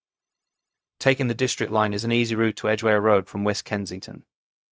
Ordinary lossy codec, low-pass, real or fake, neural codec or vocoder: none; none; fake; codec, 16 kHz, 0.4 kbps, LongCat-Audio-Codec